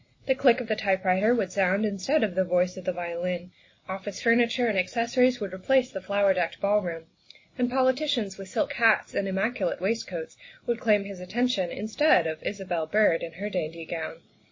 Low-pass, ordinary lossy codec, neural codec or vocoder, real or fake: 7.2 kHz; MP3, 32 kbps; none; real